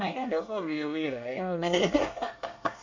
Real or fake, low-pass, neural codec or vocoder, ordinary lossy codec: fake; 7.2 kHz; codec, 24 kHz, 1 kbps, SNAC; MP3, 48 kbps